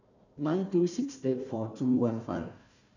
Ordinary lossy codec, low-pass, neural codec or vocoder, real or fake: none; 7.2 kHz; codec, 16 kHz, 1 kbps, FunCodec, trained on Chinese and English, 50 frames a second; fake